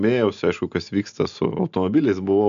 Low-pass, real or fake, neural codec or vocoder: 7.2 kHz; real; none